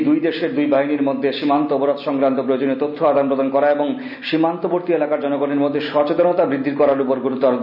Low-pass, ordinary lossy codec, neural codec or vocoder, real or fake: 5.4 kHz; none; none; real